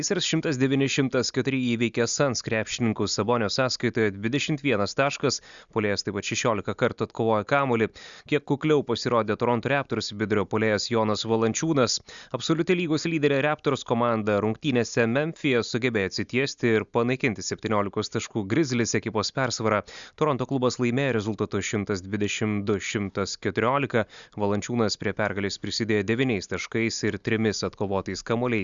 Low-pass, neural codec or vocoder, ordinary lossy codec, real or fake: 7.2 kHz; none; Opus, 64 kbps; real